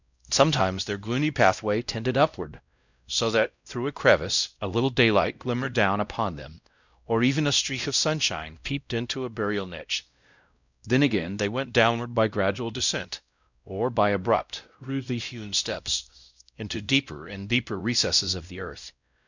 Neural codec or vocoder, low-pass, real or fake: codec, 16 kHz, 0.5 kbps, X-Codec, WavLM features, trained on Multilingual LibriSpeech; 7.2 kHz; fake